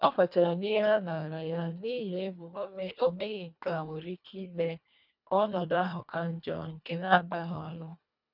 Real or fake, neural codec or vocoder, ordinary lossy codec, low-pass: fake; codec, 24 kHz, 1.5 kbps, HILCodec; none; 5.4 kHz